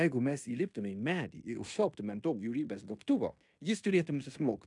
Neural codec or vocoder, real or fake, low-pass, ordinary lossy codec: codec, 16 kHz in and 24 kHz out, 0.9 kbps, LongCat-Audio-Codec, fine tuned four codebook decoder; fake; 10.8 kHz; MP3, 96 kbps